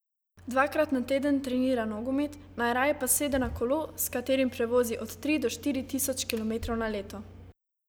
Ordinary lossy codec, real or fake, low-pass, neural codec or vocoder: none; real; none; none